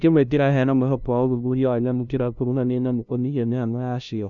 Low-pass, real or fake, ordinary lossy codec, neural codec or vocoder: 7.2 kHz; fake; none; codec, 16 kHz, 0.5 kbps, FunCodec, trained on LibriTTS, 25 frames a second